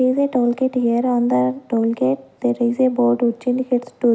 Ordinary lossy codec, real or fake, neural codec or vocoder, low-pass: none; real; none; none